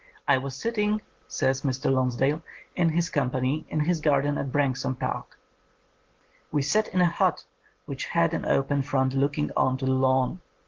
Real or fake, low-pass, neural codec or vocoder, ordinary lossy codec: real; 7.2 kHz; none; Opus, 16 kbps